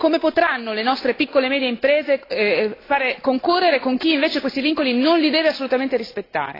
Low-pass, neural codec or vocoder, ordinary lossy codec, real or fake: 5.4 kHz; none; AAC, 24 kbps; real